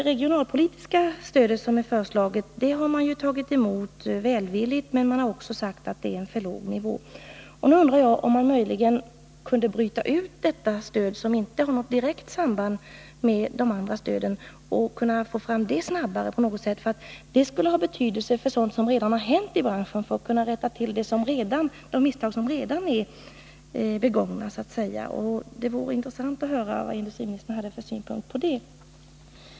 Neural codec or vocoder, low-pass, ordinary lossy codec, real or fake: none; none; none; real